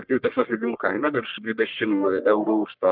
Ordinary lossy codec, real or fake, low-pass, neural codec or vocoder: Opus, 24 kbps; fake; 5.4 kHz; codec, 44.1 kHz, 1.7 kbps, Pupu-Codec